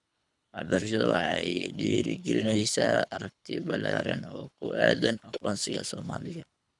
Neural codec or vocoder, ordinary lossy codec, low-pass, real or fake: codec, 24 kHz, 3 kbps, HILCodec; none; 10.8 kHz; fake